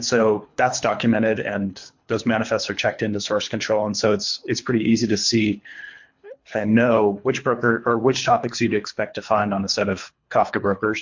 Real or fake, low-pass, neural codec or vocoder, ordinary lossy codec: fake; 7.2 kHz; codec, 24 kHz, 3 kbps, HILCodec; MP3, 48 kbps